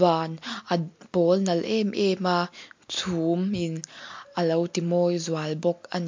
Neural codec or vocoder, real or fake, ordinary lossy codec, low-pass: none; real; MP3, 48 kbps; 7.2 kHz